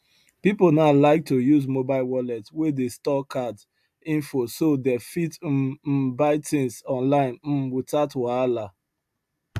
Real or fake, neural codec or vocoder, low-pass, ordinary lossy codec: real; none; 14.4 kHz; AAC, 96 kbps